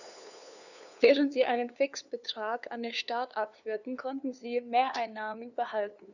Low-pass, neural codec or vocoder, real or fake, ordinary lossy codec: 7.2 kHz; codec, 16 kHz, 2 kbps, FunCodec, trained on LibriTTS, 25 frames a second; fake; none